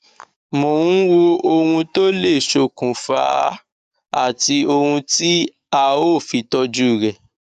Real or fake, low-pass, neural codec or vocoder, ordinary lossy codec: fake; 14.4 kHz; codec, 44.1 kHz, 7.8 kbps, DAC; none